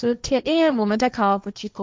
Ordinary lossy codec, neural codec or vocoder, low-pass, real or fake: none; codec, 16 kHz, 1.1 kbps, Voila-Tokenizer; none; fake